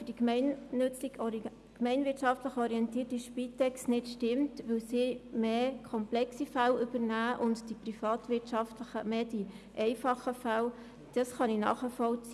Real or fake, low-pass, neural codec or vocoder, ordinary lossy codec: real; none; none; none